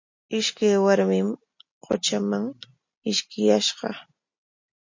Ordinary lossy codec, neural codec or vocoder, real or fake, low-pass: MP3, 48 kbps; none; real; 7.2 kHz